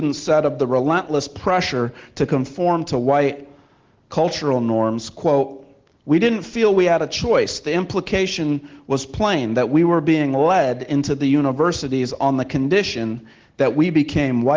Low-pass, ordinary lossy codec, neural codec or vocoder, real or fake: 7.2 kHz; Opus, 16 kbps; none; real